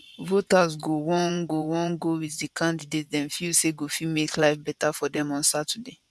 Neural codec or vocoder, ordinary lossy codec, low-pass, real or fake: vocoder, 24 kHz, 100 mel bands, Vocos; none; none; fake